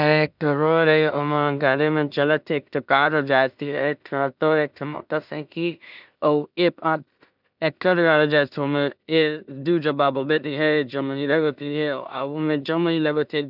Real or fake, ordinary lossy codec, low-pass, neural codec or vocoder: fake; none; 5.4 kHz; codec, 16 kHz in and 24 kHz out, 0.4 kbps, LongCat-Audio-Codec, two codebook decoder